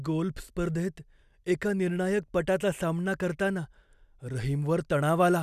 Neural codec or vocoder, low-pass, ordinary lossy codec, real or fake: none; 14.4 kHz; none; real